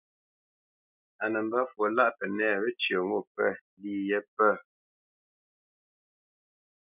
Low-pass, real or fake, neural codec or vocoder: 3.6 kHz; real; none